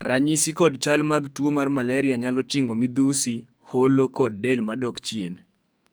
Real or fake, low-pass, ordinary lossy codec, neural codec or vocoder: fake; none; none; codec, 44.1 kHz, 2.6 kbps, SNAC